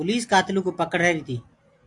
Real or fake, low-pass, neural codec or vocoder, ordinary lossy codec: real; 10.8 kHz; none; AAC, 64 kbps